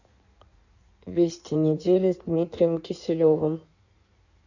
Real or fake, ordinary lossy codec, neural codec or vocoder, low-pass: fake; none; codec, 16 kHz in and 24 kHz out, 1.1 kbps, FireRedTTS-2 codec; 7.2 kHz